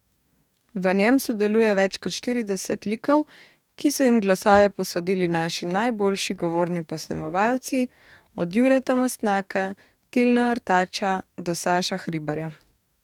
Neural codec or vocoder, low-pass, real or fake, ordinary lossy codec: codec, 44.1 kHz, 2.6 kbps, DAC; 19.8 kHz; fake; none